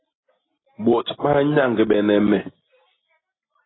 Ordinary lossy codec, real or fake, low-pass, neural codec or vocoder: AAC, 16 kbps; real; 7.2 kHz; none